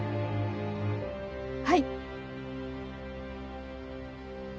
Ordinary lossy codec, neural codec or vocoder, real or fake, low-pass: none; none; real; none